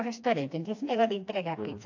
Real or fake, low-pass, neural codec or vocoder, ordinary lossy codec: fake; 7.2 kHz; codec, 16 kHz, 2 kbps, FreqCodec, smaller model; AAC, 48 kbps